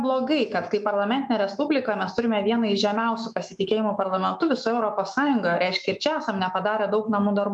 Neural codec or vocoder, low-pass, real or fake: autoencoder, 48 kHz, 128 numbers a frame, DAC-VAE, trained on Japanese speech; 10.8 kHz; fake